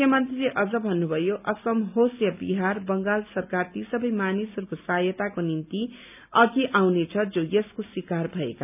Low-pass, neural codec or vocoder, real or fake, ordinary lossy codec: 3.6 kHz; none; real; none